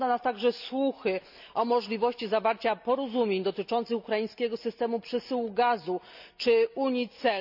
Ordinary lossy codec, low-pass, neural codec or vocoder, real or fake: none; 5.4 kHz; none; real